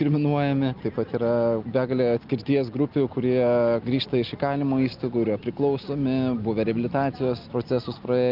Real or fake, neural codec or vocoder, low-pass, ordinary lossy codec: real; none; 5.4 kHz; Opus, 24 kbps